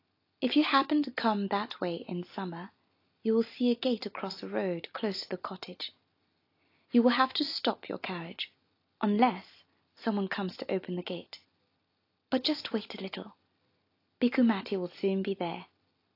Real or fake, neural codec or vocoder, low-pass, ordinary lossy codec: real; none; 5.4 kHz; AAC, 32 kbps